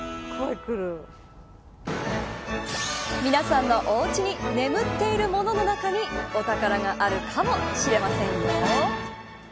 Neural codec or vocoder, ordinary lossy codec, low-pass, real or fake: none; none; none; real